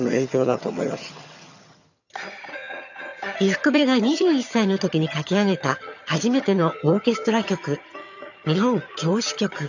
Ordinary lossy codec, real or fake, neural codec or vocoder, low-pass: none; fake; vocoder, 22.05 kHz, 80 mel bands, HiFi-GAN; 7.2 kHz